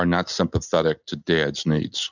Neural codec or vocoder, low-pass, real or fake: none; 7.2 kHz; real